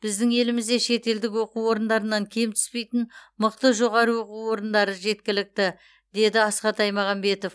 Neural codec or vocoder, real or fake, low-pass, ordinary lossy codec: none; real; none; none